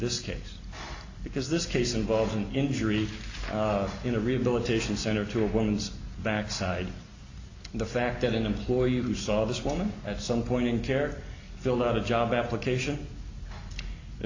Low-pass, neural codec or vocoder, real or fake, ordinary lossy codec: 7.2 kHz; none; real; AAC, 48 kbps